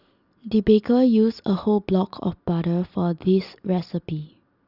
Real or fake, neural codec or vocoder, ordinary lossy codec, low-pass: real; none; Opus, 64 kbps; 5.4 kHz